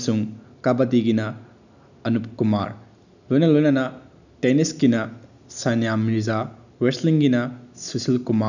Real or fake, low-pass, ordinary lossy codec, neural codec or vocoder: real; 7.2 kHz; none; none